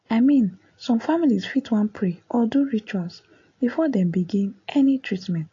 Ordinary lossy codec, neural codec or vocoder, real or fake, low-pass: AAC, 32 kbps; none; real; 7.2 kHz